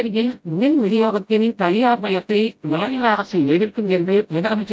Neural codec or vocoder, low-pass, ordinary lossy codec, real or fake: codec, 16 kHz, 0.5 kbps, FreqCodec, smaller model; none; none; fake